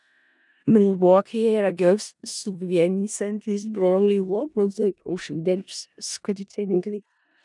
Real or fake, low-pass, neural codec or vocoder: fake; 10.8 kHz; codec, 16 kHz in and 24 kHz out, 0.4 kbps, LongCat-Audio-Codec, four codebook decoder